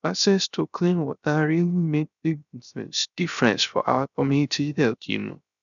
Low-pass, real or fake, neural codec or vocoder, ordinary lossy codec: 7.2 kHz; fake; codec, 16 kHz, 0.3 kbps, FocalCodec; none